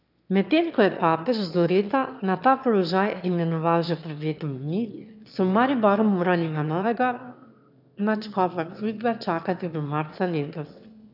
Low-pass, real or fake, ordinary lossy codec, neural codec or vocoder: 5.4 kHz; fake; none; autoencoder, 22.05 kHz, a latent of 192 numbers a frame, VITS, trained on one speaker